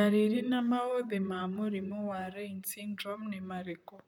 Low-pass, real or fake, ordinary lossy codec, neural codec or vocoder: 19.8 kHz; fake; none; vocoder, 44.1 kHz, 128 mel bands, Pupu-Vocoder